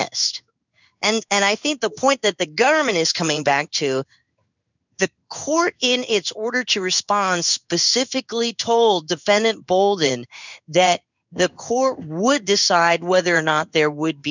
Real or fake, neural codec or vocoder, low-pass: fake; codec, 16 kHz in and 24 kHz out, 1 kbps, XY-Tokenizer; 7.2 kHz